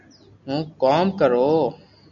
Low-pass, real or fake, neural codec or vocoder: 7.2 kHz; real; none